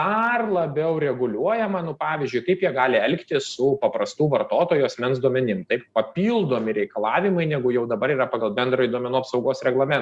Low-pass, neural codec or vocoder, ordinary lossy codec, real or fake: 10.8 kHz; none; Opus, 64 kbps; real